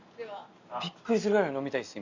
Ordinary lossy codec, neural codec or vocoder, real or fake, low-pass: Opus, 64 kbps; none; real; 7.2 kHz